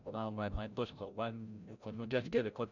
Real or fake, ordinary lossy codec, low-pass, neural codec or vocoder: fake; none; 7.2 kHz; codec, 16 kHz, 0.5 kbps, FreqCodec, larger model